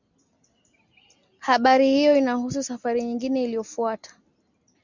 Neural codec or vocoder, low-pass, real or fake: none; 7.2 kHz; real